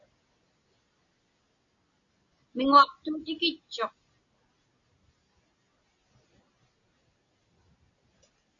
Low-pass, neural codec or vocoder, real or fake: 7.2 kHz; none; real